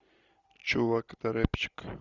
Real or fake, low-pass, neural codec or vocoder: real; 7.2 kHz; none